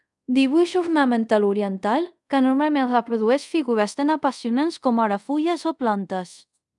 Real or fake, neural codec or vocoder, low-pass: fake; codec, 24 kHz, 0.5 kbps, DualCodec; 10.8 kHz